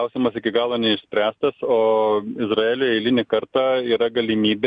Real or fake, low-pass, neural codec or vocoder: real; 9.9 kHz; none